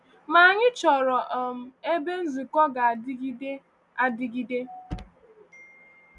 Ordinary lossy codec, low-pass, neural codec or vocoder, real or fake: none; 10.8 kHz; none; real